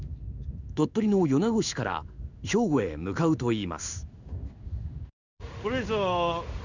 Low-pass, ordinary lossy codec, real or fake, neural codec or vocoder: 7.2 kHz; none; fake; codec, 16 kHz in and 24 kHz out, 1 kbps, XY-Tokenizer